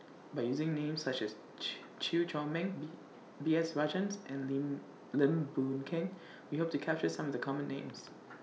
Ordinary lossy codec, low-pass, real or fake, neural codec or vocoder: none; none; real; none